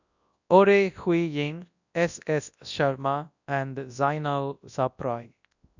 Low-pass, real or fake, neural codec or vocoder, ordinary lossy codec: 7.2 kHz; fake; codec, 24 kHz, 0.9 kbps, WavTokenizer, large speech release; none